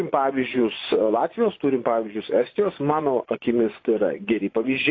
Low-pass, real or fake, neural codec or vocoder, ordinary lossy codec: 7.2 kHz; fake; vocoder, 24 kHz, 100 mel bands, Vocos; AAC, 32 kbps